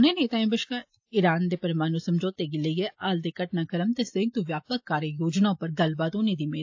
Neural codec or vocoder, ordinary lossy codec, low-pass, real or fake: none; AAC, 48 kbps; 7.2 kHz; real